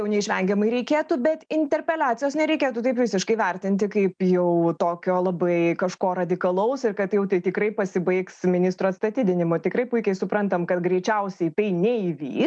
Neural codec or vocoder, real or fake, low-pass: none; real; 9.9 kHz